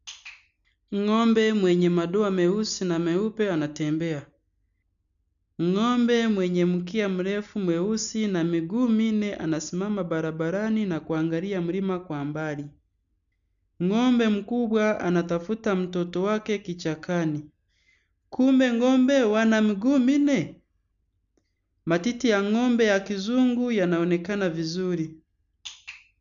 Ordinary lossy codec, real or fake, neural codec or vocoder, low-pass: none; real; none; 7.2 kHz